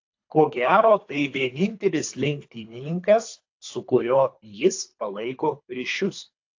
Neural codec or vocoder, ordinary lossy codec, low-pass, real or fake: codec, 24 kHz, 3 kbps, HILCodec; AAC, 48 kbps; 7.2 kHz; fake